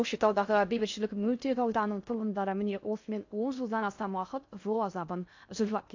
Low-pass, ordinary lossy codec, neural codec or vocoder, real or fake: 7.2 kHz; AAC, 48 kbps; codec, 16 kHz in and 24 kHz out, 0.6 kbps, FocalCodec, streaming, 4096 codes; fake